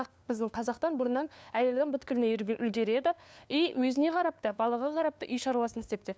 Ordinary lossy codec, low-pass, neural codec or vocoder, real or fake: none; none; codec, 16 kHz, 2 kbps, FunCodec, trained on LibriTTS, 25 frames a second; fake